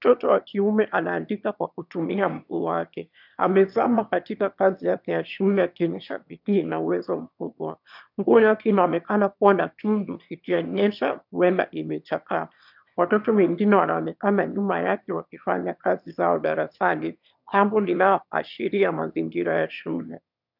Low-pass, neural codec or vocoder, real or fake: 5.4 kHz; autoencoder, 22.05 kHz, a latent of 192 numbers a frame, VITS, trained on one speaker; fake